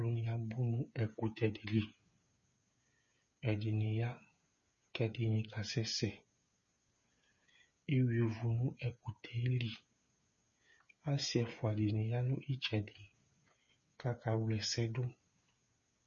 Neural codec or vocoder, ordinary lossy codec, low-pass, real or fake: codec, 16 kHz, 8 kbps, FreqCodec, smaller model; MP3, 32 kbps; 7.2 kHz; fake